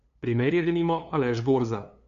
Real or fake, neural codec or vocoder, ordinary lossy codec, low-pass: fake; codec, 16 kHz, 2 kbps, FunCodec, trained on LibriTTS, 25 frames a second; none; 7.2 kHz